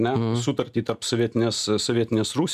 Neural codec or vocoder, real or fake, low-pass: none; real; 14.4 kHz